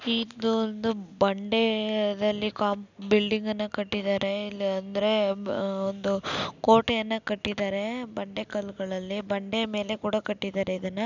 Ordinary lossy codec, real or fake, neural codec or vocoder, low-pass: none; real; none; 7.2 kHz